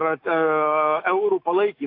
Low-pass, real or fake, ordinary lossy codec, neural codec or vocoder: 5.4 kHz; fake; AAC, 32 kbps; autoencoder, 48 kHz, 128 numbers a frame, DAC-VAE, trained on Japanese speech